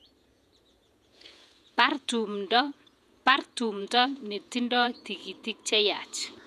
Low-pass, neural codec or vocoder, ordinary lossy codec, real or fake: 14.4 kHz; vocoder, 48 kHz, 128 mel bands, Vocos; none; fake